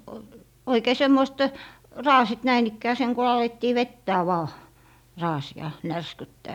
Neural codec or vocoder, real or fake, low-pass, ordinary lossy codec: none; real; 19.8 kHz; none